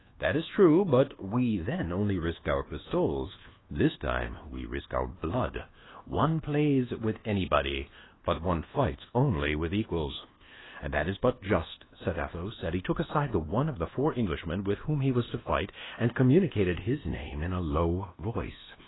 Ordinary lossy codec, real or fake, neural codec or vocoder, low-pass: AAC, 16 kbps; fake; codec, 24 kHz, 1.2 kbps, DualCodec; 7.2 kHz